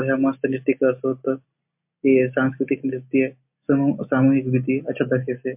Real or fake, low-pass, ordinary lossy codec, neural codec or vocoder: real; 3.6 kHz; MP3, 32 kbps; none